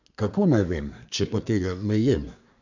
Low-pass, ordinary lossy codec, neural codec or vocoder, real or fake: 7.2 kHz; none; codec, 24 kHz, 1 kbps, SNAC; fake